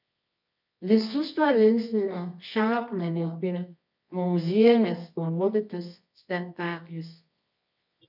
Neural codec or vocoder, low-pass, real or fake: codec, 24 kHz, 0.9 kbps, WavTokenizer, medium music audio release; 5.4 kHz; fake